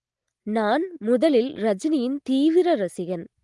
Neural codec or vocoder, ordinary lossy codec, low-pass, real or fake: none; Opus, 24 kbps; 10.8 kHz; real